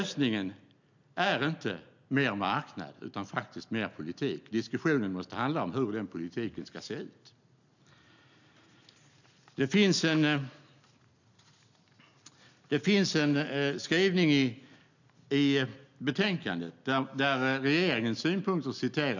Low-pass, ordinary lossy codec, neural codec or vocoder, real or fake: 7.2 kHz; none; none; real